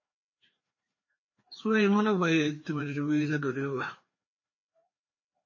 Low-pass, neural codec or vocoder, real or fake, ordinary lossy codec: 7.2 kHz; codec, 16 kHz, 2 kbps, FreqCodec, larger model; fake; MP3, 32 kbps